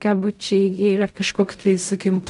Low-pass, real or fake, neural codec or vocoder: 10.8 kHz; fake; codec, 16 kHz in and 24 kHz out, 0.4 kbps, LongCat-Audio-Codec, fine tuned four codebook decoder